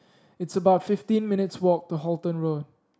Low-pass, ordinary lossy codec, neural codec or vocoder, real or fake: none; none; none; real